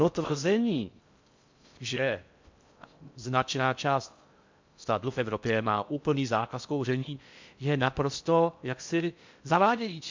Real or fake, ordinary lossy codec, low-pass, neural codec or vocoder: fake; MP3, 64 kbps; 7.2 kHz; codec, 16 kHz in and 24 kHz out, 0.6 kbps, FocalCodec, streaming, 4096 codes